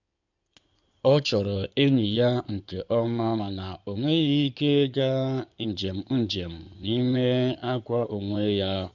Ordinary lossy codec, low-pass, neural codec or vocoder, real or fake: none; 7.2 kHz; codec, 16 kHz in and 24 kHz out, 2.2 kbps, FireRedTTS-2 codec; fake